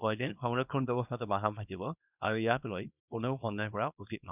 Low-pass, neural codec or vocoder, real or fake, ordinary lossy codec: 3.6 kHz; codec, 24 kHz, 0.9 kbps, WavTokenizer, small release; fake; none